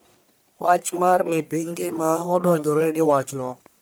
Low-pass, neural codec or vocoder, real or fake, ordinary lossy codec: none; codec, 44.1 kHz, 1.7 kbps, Pupu-Codec; fake; none